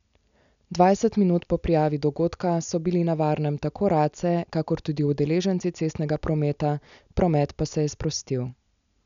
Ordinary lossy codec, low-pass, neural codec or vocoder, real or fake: none; 7.2 kHz; none; real